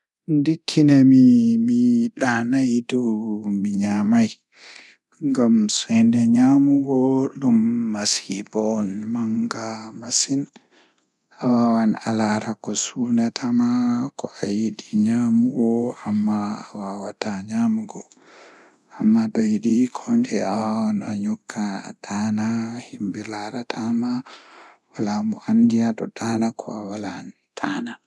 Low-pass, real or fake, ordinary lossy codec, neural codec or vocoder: none; fake; none; codec, 24 kHz, 0.9 kbps, DualCodec